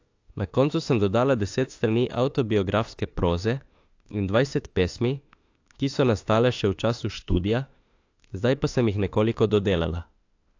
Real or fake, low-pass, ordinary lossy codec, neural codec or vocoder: fake; 7.2 kHz; AAC, 48 kbps; autoencoder, 48 kHz, 32 numbers a frame, DAC-VAE, trained on Japanese speech